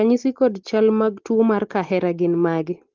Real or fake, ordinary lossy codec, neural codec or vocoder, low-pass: real; Opus, 16 kbps; none; 7.2 kHz